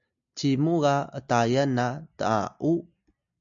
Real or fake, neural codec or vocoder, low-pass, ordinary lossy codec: real; none; 7.2 kHz; MP3, 64 kbps